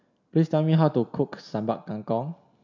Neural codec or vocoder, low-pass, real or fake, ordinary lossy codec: none; 7.2 kHz; real; none